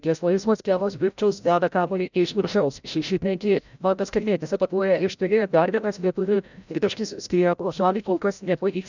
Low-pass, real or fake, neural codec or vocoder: 7.2 kHz; fake; codec, 16 kHz, 0.5 kbps, FreqCodec, larger model